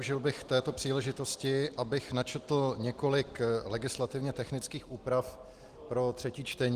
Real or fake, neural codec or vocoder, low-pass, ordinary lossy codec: real; none; 14.4 kHz; Opus, 24 kbps